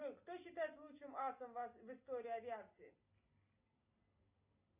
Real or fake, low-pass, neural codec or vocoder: real; 3.6 kHz; none